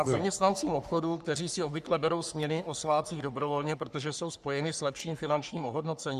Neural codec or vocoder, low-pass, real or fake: codec, 44.1 kHz, 3.4 kbps, Pupu-Codec; 14.4 kHz; fake